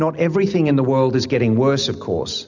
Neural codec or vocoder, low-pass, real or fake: none; 7.2 kHz; real